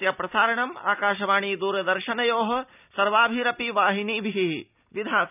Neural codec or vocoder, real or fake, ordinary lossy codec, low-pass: none; real; none; 3.6 kHz